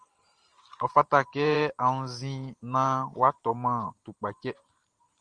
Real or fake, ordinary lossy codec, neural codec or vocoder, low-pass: fake; Opus, 24 kbps; vocoder, 44.1 kHz, 128 mel bands every 512 samples, BigVGAN v2; 9.9 kHz